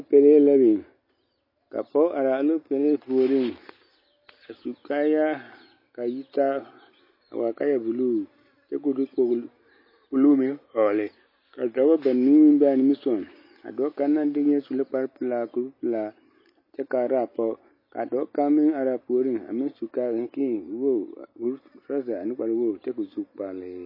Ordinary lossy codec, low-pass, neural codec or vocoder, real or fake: MP3, 24 kbps; 5.4 kHz; none; real